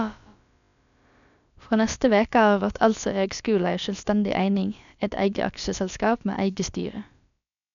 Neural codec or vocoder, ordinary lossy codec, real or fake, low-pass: codec, 16 kHz, about 1 kbps, DyCAST, with the encoder's durations; none; fake; 7.2 kHz